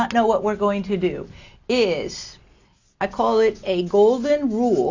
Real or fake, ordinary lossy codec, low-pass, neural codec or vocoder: real; AAC, 48 kbps; 7.2 kHz; none